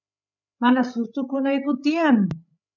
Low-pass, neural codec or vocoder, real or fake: 7.2 kHz; codec, 16 kHz, 8 kbps, FreqCodec, larger model; fake